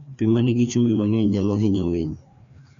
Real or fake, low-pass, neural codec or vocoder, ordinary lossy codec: fake; 7.2 kHz; codec, 16 kHz, 2 kbps, FreqCodec, larger model; none